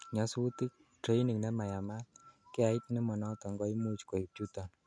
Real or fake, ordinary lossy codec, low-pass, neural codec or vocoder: real; none; 9.9 kHz; none